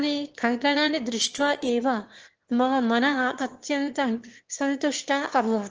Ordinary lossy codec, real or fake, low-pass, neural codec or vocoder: Opus, 16 kbps; fake; 7.2 kHz; autoencoder, 22.05 kHz, a latent of 192 numbers a frame, VITS, trained on one speaker